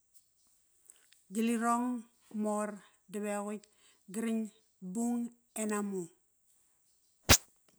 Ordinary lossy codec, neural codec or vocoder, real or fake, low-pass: none; none; real; none